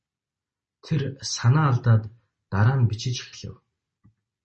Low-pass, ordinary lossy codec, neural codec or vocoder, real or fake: 10.8 kHz; MP3, 32 kbps; none; real